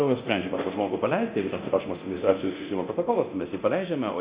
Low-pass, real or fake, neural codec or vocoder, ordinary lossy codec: 3.6 kHz; fake; codec, 24 kHz, 0.9 kbps, DualCodec; Opus, 64 kbps